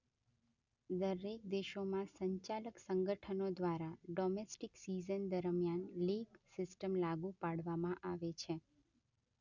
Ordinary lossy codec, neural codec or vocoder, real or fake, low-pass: none; none; real; 7.2 kHz